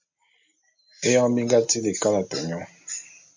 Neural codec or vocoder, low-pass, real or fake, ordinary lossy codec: none; 7.2 kHz; real; MP3, 48 kbps